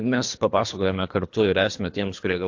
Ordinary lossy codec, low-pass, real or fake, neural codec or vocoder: AAC, 48 kbps; 7.2 kHz; fake; codec, 24 kHz, 3 kbps, HILCodec